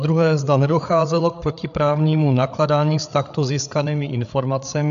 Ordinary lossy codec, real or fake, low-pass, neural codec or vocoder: AAC, 96 kbps; fake; 7.2 kHz; codec, 16 kHz, 8 kbps, FreqCodec, larger model